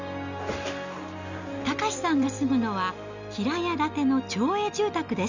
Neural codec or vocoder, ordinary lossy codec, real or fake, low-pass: none; none; real; 7.2 kHz